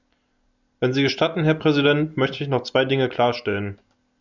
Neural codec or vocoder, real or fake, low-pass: none; real; 7.2 kHz